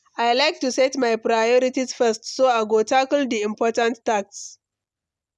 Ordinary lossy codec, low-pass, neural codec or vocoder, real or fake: none; none; none; real